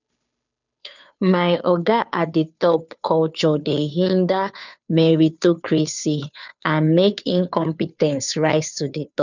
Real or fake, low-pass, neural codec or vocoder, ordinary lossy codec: fake; 7.2 kHz; codec, 16 kHz, 2 kbps, FunCodec, trained on Chinese and English, 25 frames a second; none